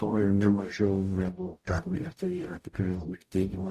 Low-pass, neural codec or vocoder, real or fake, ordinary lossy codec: 14.4 kHz; codec, 44.1 kHz, 0.9 kbps, DAC; fake; Opus, 64 kbps